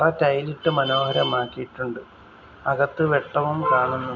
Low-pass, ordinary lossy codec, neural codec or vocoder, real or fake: 7.2 kHz; none; none; real